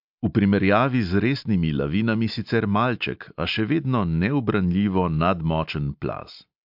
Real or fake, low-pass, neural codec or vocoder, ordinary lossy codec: real; 5.4 kHz; none; MP3, 48 kbps